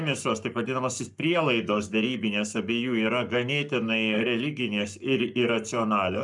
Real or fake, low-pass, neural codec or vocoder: fake; 10.8 kHz; codec, 44.1 kHz, 7.8 kbps, Pupu-Codec